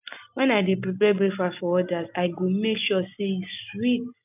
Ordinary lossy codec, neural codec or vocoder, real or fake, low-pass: none; none; real; 3.6 kHz